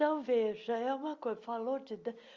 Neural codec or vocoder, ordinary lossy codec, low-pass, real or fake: none; Opus, 32 kbps; 7.2 kHz; real